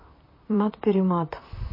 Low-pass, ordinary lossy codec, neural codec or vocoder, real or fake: 5.4 kHz; MP3, 24 kbps; vocoder, 44.1 kHz, 128 mel bands, Pupu-Vocoder; fake